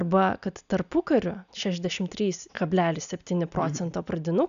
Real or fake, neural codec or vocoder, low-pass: real; none; 7.2 kHz